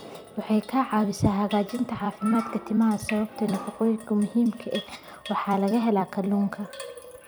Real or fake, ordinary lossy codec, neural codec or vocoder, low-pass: fake; none; vocoder, 44.1 kHz, 128 mel bands every 256 samples, BigVGAN v2; none